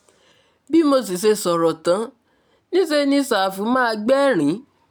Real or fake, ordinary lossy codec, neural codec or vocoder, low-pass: real; none; none; none